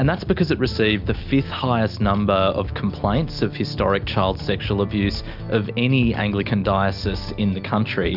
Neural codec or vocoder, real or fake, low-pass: none; real; 5.4 kHz